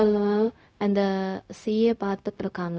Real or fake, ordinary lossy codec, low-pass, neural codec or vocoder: fake; none; none; codec, 16 kHz, 0.4 kbps, LongCat-Audio-Codec